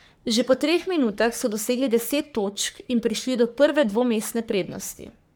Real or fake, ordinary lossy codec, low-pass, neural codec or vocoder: fake; none; none; codec, 44.1 kHz, 3.4 kbps, Pupu-Codec